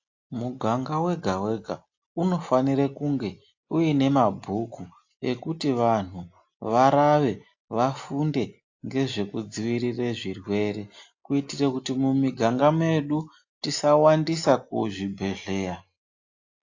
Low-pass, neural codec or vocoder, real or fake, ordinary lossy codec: 7.2 kHz; none; real; AAC, 48 kbps